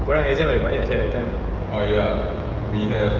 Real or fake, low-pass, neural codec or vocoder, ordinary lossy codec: fake; 7.2 kHz; codec, 16 kHz, 16 kbps, FreqCodec, smaller model; Opus, 24 kbps